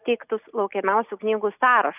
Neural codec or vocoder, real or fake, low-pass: none; real; 3.6 kHz